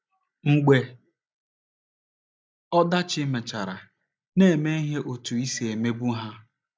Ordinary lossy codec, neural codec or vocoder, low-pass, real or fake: none; none; none; real